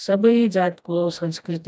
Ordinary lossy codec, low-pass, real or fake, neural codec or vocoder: none; none; fake; codec, 16 kHz, 1 kbps, FreqCodec, smaller model